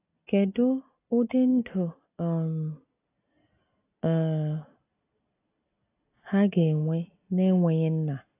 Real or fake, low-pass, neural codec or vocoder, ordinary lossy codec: real; 3.6 kHz; none; AAC, 24 kbps